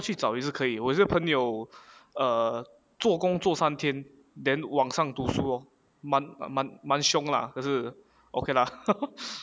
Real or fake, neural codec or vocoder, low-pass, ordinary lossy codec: real; none; none; none